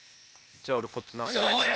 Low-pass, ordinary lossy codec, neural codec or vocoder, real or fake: none; none; codec, 16 kHz, 0.8 kbps, ZipCodec; fake